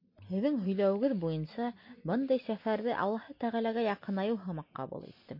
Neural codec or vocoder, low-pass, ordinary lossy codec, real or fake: none; 5.4 kHz; MP3, 32 kbps; real